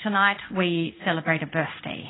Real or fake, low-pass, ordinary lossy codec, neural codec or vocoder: fake; 7.2 kHz; AAC, 16 kbps; codec, 16 kHz in and 24 kHz out, 1 kbps, XY-Tokenizer